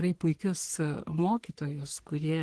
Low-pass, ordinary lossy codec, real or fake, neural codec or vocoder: 10.8 kHz; Opus, 16 kbps; fake; codec, 44.1 kHz, 3.4 kbps, Pupu-Codec